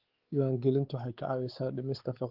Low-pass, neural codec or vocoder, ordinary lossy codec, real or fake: 5.4 kHz; codec, 16 kHz, 4 kbps, X-Codec, WavLM features, trained on Multilingual LibriSpeech; Opus, 16 kbps; fake